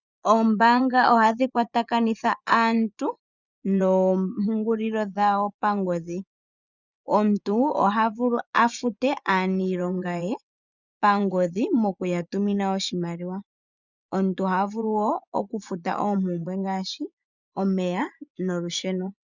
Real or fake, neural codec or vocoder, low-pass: fake; vocoder, 24 kHz, 100 mel bands, Vocos; 7.2 kHz